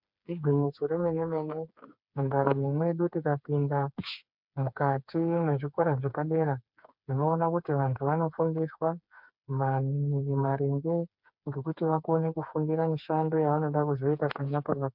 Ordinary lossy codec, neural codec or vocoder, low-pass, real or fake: MP3, 48 kbps; codec, 16 kHz, 4 kbps, FreqCodec, smaller model; 5.4 kHz; fake